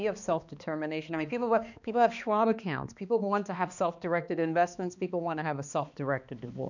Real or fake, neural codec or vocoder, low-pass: fake; codec, 16 kHz, 2 kbps, X-Codec, HuBERT features, trained on balanced general audio; 7.2 kHz